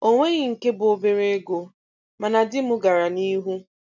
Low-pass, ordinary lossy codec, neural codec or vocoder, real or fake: 7.2 kHz; none; none; real